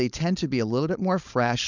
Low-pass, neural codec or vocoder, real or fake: 7.2 kHz; codec, 16 kHz, 4.8 kbps, FACodec; fake